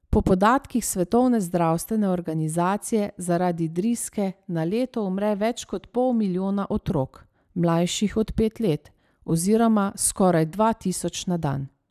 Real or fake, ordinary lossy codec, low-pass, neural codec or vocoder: real; none; 14.4 kHz; none